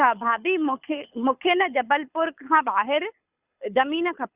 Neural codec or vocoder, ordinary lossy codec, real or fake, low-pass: codec, 24 kHz, 6 kbps, HILCodec; Opus, 64 kbps; fake; 3.6 kHz